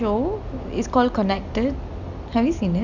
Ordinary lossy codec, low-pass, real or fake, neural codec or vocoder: none; 7.2 kHz; real; none